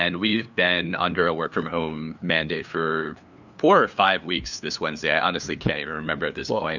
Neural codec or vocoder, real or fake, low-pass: codec, 16 kHz, 2 kbps, FunCodec, trained on LibriTTS, 25 frames a second; fake; 7.2 kHz